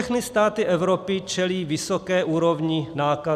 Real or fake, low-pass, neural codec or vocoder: real; 14.4 kHz; none